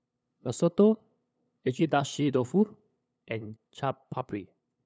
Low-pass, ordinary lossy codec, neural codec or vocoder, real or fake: none; none; codec, 16 kHz, 8 kbps, FunCodec, trained on LibriTTS, 25 frames a second; fake